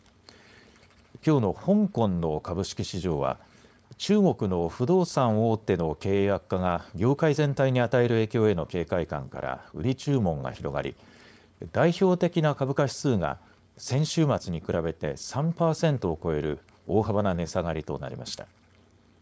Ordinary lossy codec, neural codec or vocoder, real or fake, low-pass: none; codec, 16 kHz, 4.8 kbps, FACodec; fake; none